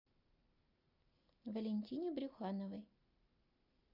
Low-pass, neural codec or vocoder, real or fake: 5.4 kHz; none; real